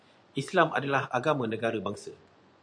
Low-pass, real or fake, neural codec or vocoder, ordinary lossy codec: 9.9 kHz; fake; vocoder, 24 kHz, 100 mel bands, Vocos; MP3, 96 kbps